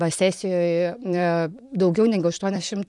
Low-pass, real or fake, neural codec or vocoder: 10.8 kHz; fake; vocoder, 44.1 kHz, 128 mel bands, Pupu-Vocoder